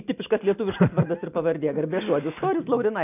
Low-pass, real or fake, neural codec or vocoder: 3.6 kHz; real; none